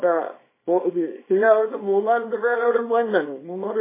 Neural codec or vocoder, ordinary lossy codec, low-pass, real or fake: codec, 24 kHz, 0.9 kbps, WavTokenizer, small release; MP3, 16 kbps; 3.6 kHz; fake